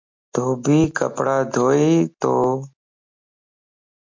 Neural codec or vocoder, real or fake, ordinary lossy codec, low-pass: none; real; MP3, 48 kbps; 7.2 kHz